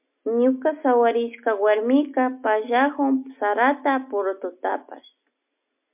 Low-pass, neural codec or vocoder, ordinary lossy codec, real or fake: 3.6 kHz; none; MP3, 32 kbps; real